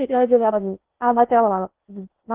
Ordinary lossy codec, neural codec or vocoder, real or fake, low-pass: Opus, 16 kbps; codec, 16 kHz in and 24 kHz out, 0.6 kbps, FocalCodec, streaming, 4096 codes; fake; 3.6 kHz